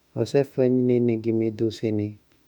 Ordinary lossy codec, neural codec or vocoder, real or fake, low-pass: none; autoencoder, 48 kHz, 32 numbers a frame, DAC-VAE, trained on Japanese speech; fake; 19.8 kHz